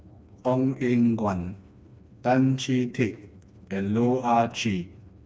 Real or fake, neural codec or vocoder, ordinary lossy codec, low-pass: fake; codec, 16 kHz, 2 kbps, FreqCodec, smaller model; none; none